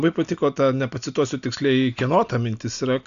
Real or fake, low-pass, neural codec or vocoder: real; 7.2 kHz; none